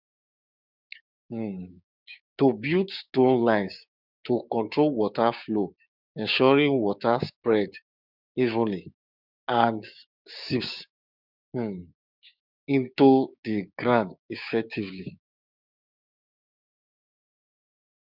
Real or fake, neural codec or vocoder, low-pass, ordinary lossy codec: fake; codec, 16 kHz, 6 kbps, DAC; 5.4 kHz; none